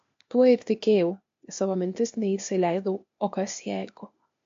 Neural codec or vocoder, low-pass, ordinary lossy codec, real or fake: codec, 16 kHz, 0.8 kbps, ZipCodec; 7.2 kHz; MP3, 48 kbps; fake